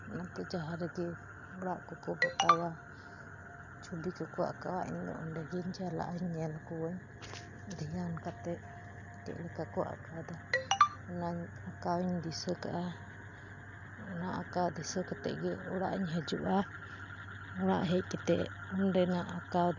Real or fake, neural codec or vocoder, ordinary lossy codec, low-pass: real; none; none; 7.2 kHz